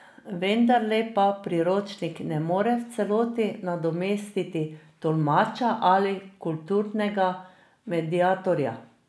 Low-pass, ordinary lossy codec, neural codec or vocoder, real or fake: none; none; none; real